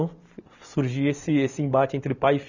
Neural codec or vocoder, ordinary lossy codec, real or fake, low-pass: none; none; real; 7.2 kHz